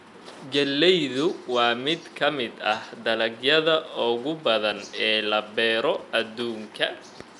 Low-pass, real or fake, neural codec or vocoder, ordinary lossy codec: 10.8 kHz; real; none; none